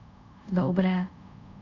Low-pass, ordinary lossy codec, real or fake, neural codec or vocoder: 7.2 kHz; none; fake; codec, 24 kHz, 0.5 kbps, DualCodec